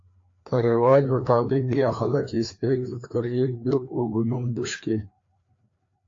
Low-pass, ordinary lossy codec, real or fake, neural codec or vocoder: 7.2 kHz; AAC, 48 kbps; fake; codec, 16 kHz, 2 kbps, FreqCodec, larger model